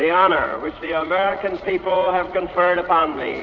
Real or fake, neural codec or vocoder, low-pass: fake; vocoder, 44.1 kHz, 80 mel bands, Vocos; 7.2 kHz